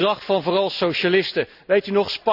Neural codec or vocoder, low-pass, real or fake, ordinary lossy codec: none; 5.4 kHz; real; none